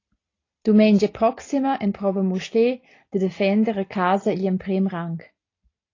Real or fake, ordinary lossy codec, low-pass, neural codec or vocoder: real; AAC, 32 kbps; 7.2 kHz; none